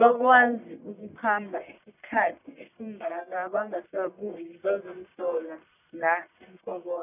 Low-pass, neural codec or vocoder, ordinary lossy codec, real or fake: 3.6 kHz; codec, 44.1 kHz, 1.7 kbps, Pupu-Codec; none; fake